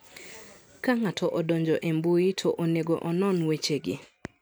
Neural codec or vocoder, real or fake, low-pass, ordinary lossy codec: none; real; none; none